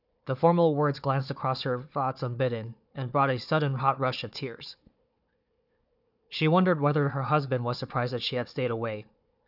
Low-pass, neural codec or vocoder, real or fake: 5.4 kHz; codec, 16 kHz, 4 kbps, FunCodec, trained on Chinese and English, 50 frames a second; fake